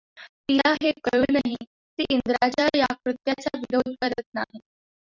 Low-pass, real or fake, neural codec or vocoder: 7.2 kHz; fake; vocoder, 44.1 kHz, 128 mel bands every 256 samples, BigVGAN v2